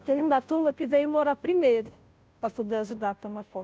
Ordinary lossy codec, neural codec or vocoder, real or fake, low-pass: none; codec, 16 kHz, 0.5 kbps, FunCodec, trained on Chinese and English, 25 frames a second; fake; none